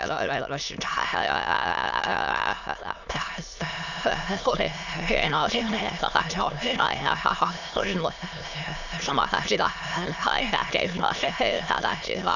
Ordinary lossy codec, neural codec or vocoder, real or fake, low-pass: none; autoencoder, 22.05 kHz, a latent of 192 numbers a frame, VITS, trained on many speakers; fake; 7.2 kHz